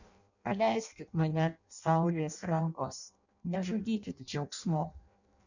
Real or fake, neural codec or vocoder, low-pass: fake; codec, 16 kHz in and 24 kHz out, 0.6 kbps, FireRedTTS-2 codec; 7.2 kHz